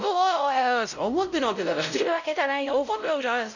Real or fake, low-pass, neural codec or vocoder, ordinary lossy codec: fake; 7.2 kHz; codec, 16 kHz, 0.5 kbps, X-Codec, WavLM features, trained on Multilingual LibriSpeech; none